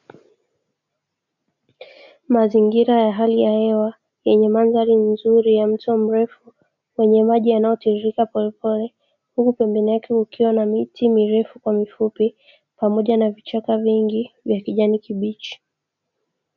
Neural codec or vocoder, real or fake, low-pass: none; real; 7.2 kHz